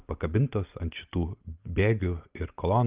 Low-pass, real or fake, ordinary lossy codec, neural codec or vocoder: 3.6 kHz; real; Opus, 24 kbps; none